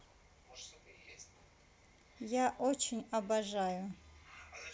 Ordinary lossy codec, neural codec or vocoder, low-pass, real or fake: none; none; none; real